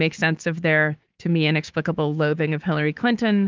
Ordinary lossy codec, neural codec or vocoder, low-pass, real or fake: Opus, 32 kbps; codec, 16 kHz, 6 kbps, DAC; 7.2 kHz; fake